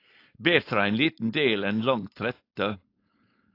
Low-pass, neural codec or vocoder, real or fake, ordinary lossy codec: 5.4 kHz; codec, 16 kHz, 4.8 kbps, FACodec; fake; AAC, 32 kbps